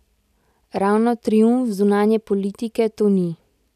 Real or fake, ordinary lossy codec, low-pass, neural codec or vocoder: real; none; 14.4 kHz; none